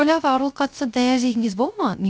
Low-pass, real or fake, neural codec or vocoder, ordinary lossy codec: none; fake; codec, 16 kHz, 0.3 kbps, FocalCodec; none